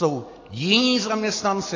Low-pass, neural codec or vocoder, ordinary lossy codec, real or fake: 7.2 kHz; none; AAC, 32 kbps; real